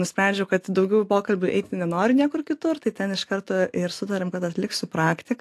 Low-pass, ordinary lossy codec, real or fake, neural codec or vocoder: 14.4 kHz; AAC, 64 kbps; real; none